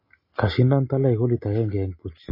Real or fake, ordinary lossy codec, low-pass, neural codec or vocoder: real; MP3, 24 kbps; 5.4 kHz; none